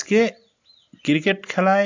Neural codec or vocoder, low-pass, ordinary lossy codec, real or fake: none; 7.2 kHz; AAC, 48 kbps; real